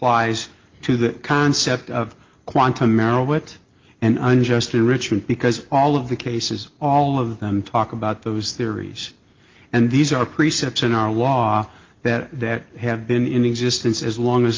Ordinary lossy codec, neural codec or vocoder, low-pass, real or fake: Opus, 32 kbps; none; 7.2 kHz; real